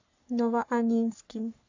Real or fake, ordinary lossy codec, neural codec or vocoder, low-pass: fake; AAC, 48 kbps; codec, 44.1 kHz, 3.4 kbps, Pupu-Codec; 7.2 kHz